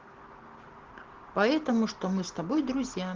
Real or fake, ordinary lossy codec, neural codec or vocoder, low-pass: real; Opus, 16 kbps; none; 7.2 kHz